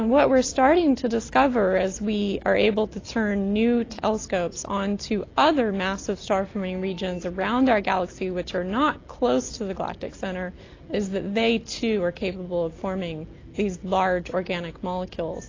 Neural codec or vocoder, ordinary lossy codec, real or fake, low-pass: none; AAC, 32 kbps; real; 7.2 kHz